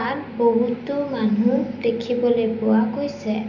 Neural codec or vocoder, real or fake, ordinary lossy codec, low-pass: none; real; none; 7.2 kHz